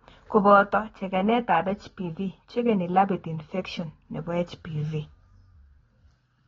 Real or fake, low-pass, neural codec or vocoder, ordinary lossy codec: real; 7.2 kHz; none; AAC, 24 kbps